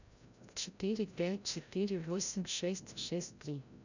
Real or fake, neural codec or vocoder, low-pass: fake; codec, 16 kHz, 0.5 kbps, FreqCodec, larger model; 7.2 kHz